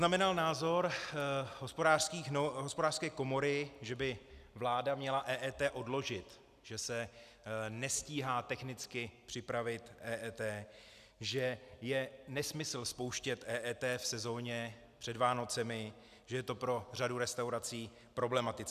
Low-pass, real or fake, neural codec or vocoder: 14.4 kHz; real; none